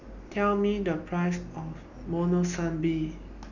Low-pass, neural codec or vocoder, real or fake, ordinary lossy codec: 7.2 kHz; none; real; none